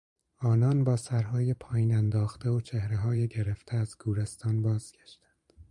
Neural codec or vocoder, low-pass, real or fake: vocoder, 44.1 kHz, 128 mel bands every 512 samples, BigVGAN v2; 10.8 kHz; fake